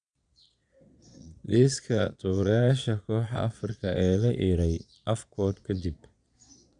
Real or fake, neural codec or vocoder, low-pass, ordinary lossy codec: fake; vocoder, 22.05 kHz, 80 mel bands, Vocos; 9.9 kHz; none